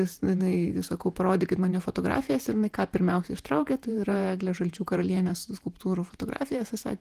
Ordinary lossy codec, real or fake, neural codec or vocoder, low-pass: Opus, 24 kbps; fake; vocoder, 48 kHz, 128 mel bands, Vocos; 14.4 kHz